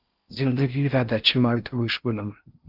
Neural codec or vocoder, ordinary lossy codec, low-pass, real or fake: codec, 16 kHz in and 24 kHz out, 0.6 kbps, FocalCodec, streaming, 4096 codes; Opus, 32 kbps; 5.4 kHz; fake